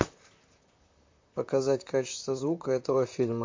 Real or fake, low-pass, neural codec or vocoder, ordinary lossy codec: fake; 7.2 kHz; vocoder, 44.1 kHz, 128 mel bands, Pupu-Vocoder; MP3, 48 kbps